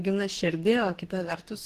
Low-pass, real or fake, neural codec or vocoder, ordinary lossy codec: 14.4 kHz; fake; codec, 44.1 kHz, 2.6 kbps, DAC; Opus, 16 kbps